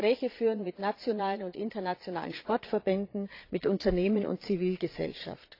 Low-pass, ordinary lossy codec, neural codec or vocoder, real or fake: 5.4 kHz; AAC, 32 kbps; vocoder, 22.05 kHz, 80 mel bands, Vocos; fake